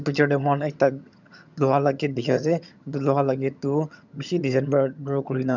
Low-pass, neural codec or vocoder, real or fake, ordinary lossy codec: 7.2 kHz; vocoder, 22.05 kHz, 80 mel bands, HiFi-GAN; fake; none